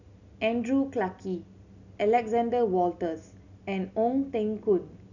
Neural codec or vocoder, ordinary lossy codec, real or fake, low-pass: none; none; real; 7.2 kHz